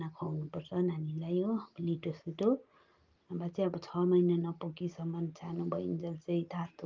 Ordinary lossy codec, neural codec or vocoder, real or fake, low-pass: Opus, 24 kbps; none; real; 7.2 kHz